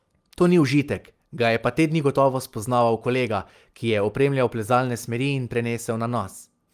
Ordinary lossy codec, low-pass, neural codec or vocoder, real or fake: Opus, 32 kbps; 14.4 kHz; none; real